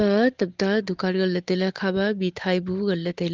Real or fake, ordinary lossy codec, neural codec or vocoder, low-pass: real; Opus, 16 kbps; none; 7.2 kHz